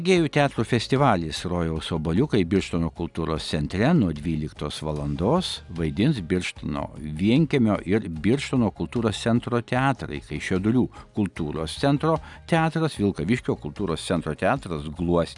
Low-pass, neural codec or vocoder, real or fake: 10.8 kHz; none; real